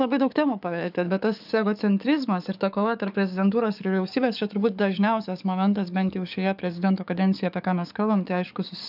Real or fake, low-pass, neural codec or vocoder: fake; 5.4 kHz; codec, 44.1 kHz, 7.8 kbps, DAC